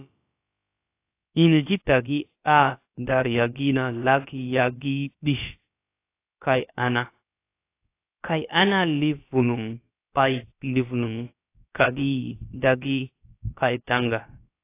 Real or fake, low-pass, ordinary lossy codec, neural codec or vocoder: fake; 3.6 kHz; AAC, 24 kbps; codec, 16 kHz, about 1 kbps, DyCAST, with the encoder's durations